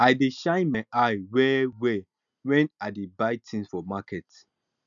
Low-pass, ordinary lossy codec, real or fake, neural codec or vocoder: 7.2 kHz; none; real; none